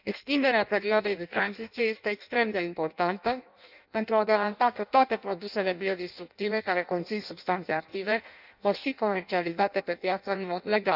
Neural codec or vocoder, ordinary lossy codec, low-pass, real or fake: codec, 16 kHz in and 24 kHz out, 0.6 kbps, FireRedTTS-2 codec; none; 5.4 kHz; fake